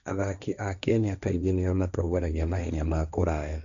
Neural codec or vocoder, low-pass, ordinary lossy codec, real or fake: codec, 16 kHz, 1.1 kbps, Voila-Tokenizer; 7.2 kHz; none; fake